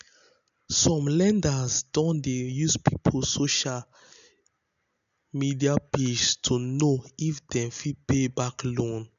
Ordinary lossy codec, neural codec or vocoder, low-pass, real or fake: none; none; 7.2 kHz; real